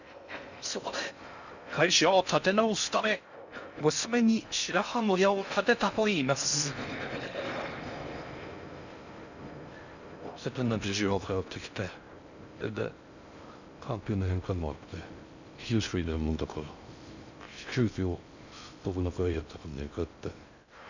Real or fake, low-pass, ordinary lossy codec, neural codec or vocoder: fake; 7.2 kHz; none; codec, 16 kHz in and 24 kHz out, 0.6 kbps, FocalCodec, streaming, 2048 codes